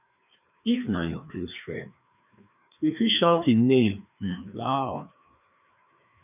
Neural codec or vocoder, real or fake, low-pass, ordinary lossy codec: codec, 16 kHz, 2 kbps, FreqCodec, larger model; fake; 3.6 kHz; none